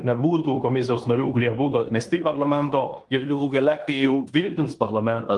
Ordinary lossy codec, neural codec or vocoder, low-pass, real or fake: Opus, 24 kbps; codec, 16 kHz in and 24 kHz out, 0.9 kbps, LongCat-Audio-Codec, fine tuned four codebook decoder; 10.8 kHz; fake